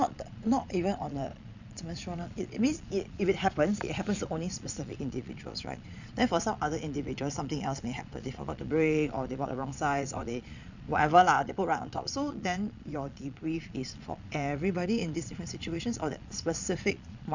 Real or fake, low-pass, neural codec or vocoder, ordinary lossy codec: fake; 7.2 kHz; vocoder, 22.05 kHz, 80 mel bands, Vocos; none